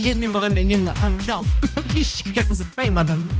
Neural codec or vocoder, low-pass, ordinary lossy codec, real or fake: codec, 16 kHz, 1 kbps, X-Codec, HuBERT features, trained on balanced general audio; none; none; fake